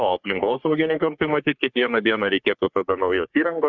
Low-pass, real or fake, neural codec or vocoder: 7.2 kHz; fake; codec, 44.1 kHz, 3.4 kbps, Pupu-Codec